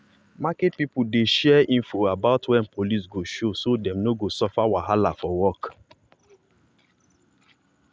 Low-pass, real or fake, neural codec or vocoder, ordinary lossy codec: none; real; none; none